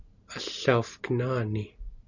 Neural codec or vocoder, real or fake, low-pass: none; real; 7.2 kHz